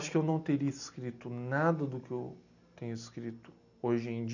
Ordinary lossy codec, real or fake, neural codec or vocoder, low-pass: none; real; none; 7.2 kHz